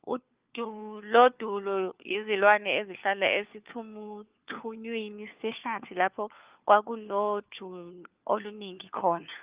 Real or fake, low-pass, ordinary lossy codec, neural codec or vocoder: fake; 3.6 kHz; Opus, 32 kbps; codec, 16 kHz, 4 kbps, FunCodec, trained on LibriTTS, 50 frames a second